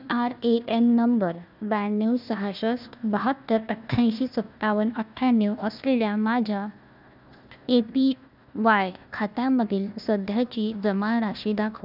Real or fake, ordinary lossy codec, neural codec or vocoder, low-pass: fake; none; codec, 16 kHz, 1 kbps, FunCodec, trained on Chinese and English, 50 frames a second; 5.4 kHz